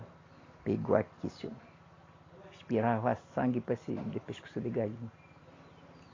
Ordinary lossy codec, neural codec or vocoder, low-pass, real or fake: AAC, 32 kbps; none; 7.2 kHz; real